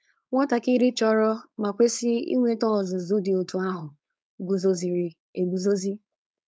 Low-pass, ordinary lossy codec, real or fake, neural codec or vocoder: none; none; fake; codec, 16 kHz, 4.8 kbps, FACodec